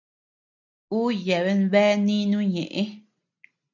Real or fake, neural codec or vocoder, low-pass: real; none; 7.2 kHz